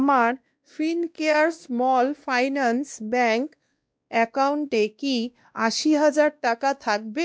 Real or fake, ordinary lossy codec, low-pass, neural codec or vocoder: fake; none; none; codec, 16 kHz, 1 kbps, X-Codec, WavLM features, trained on Multilingual LibriSpeech